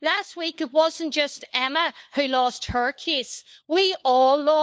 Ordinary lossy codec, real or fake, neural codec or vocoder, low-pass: none; fake; codec, 16 kHz, 4 kbps, FunCodec, trained on LibriTTS, 50 frames a second; none